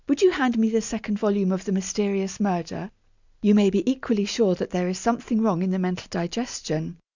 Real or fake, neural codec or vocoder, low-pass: real; none; 7.2 kHz